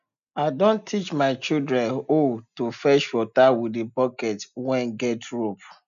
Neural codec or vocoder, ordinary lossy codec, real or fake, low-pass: none; none; real; 7.2 kHz